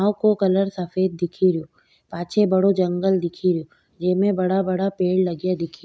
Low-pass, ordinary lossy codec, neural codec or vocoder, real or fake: none; none; none; real